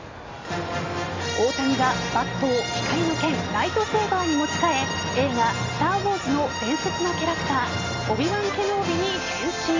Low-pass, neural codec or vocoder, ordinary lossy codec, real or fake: 7.2 kHz; none; AAC, 32 kbps; real